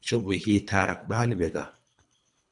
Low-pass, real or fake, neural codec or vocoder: 10.8 kHz; fake; codec, 24 kHz, 3 kbps, HILCodec